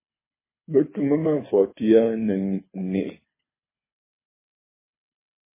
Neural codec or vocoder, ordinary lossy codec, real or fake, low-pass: codec, 24 kHz, 6 kbps, HILCodec; MP3, 16 kbps; fake; 3.6 kHz